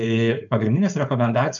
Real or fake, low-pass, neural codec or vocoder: fake; 7.2 kHz; codec, 16 kHz, 4.8 kbps, FACodec